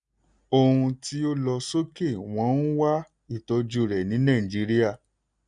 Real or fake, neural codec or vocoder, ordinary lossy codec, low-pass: real; none; none; 9.9 kHz